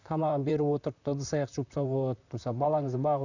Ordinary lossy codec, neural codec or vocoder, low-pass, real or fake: none; vocoder, 44.1 kHz, 128 mel bands, Pupu-Vocoder; 7.2 kHz; fake